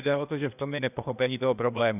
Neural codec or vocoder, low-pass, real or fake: codec, 16 kHz, 0.8 kbps, ZipCodec; 3.6 kHz; fake